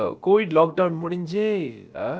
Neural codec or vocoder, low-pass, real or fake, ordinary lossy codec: codec, 16 kHz, about 1 kbps, DyCAST, with the encoder's durations; none; fake; none